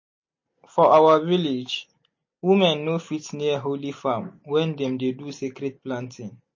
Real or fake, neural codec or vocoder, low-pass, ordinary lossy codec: real; none; 7.2 kHz; MP3, 32 kbps